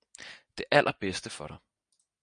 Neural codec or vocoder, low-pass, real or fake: none; 9.9 kHz; real